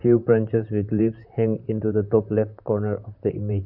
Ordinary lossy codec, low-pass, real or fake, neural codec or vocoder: none; 5.4 kHz; fake; vocoder, 44.1 kHz, 128 mel bands, Pupu-Vocoder